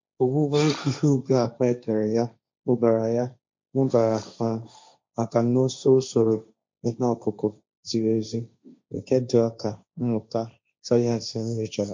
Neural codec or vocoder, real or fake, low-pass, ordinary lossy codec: codec, 16 kHz, 1.1 kbps, Voila-Tokenizer; fake; 7.2 kHz; MP3, 48 kbps